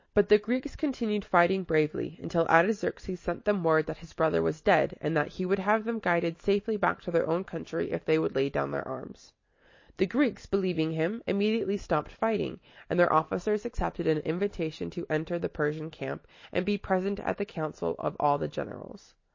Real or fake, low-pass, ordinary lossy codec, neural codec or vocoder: real; 7.2 kHz; MP3, 32 kbps; none